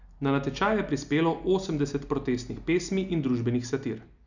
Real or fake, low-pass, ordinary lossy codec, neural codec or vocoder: real; 7.2 kHz; none; none